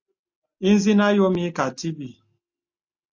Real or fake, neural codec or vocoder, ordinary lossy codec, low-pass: real; none; AAC, 48 kbps; 7.2 kHz